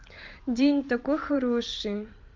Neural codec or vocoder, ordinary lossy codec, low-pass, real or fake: vocoder, 44.1 kHz, 128 mel bands every 512 samples, BigVGAN v2; Opus, 32 kbps; 7.2 kHz; fake